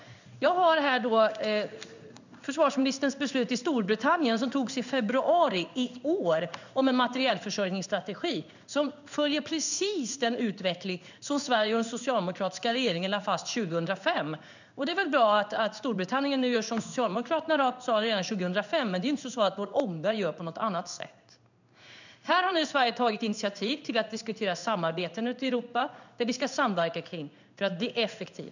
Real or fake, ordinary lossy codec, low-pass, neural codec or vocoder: fake; none; 7.2 kHz; codec, 16 kHz in and 24 kHz out, 1 kbps, XY-Tokenizer